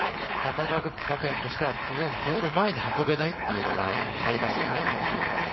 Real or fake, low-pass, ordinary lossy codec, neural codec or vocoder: fake; 7.2 kHz; MP3, 24 kbps; codec, 16 kHz, 4.8 kbps, FACodec